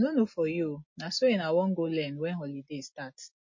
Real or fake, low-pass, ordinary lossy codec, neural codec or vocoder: real; 7.2 kHz; MP3, 32 kbps; none